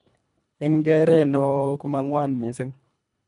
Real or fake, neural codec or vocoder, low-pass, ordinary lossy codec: fake; codec, 24 kHz, 1.5 kbps, HILCodec; 10.8 kHz; MP3, 96 kbps